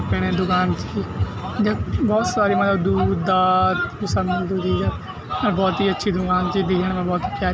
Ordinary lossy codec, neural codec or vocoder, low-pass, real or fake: none; none; none; real